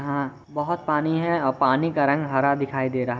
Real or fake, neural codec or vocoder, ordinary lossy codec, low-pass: real; none; none; none